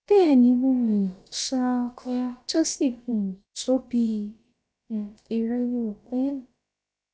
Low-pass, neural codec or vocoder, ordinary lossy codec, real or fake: none; codec, 16 kHz, about 1 kbps, DyCAST, with the encoder's durations; none; fake